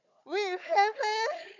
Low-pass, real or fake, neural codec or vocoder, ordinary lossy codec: 7.2 kHz; fake; codec, 16 kHz, 4 kbps, FunCodec, trained on Chinese and English, 50 frames a second; none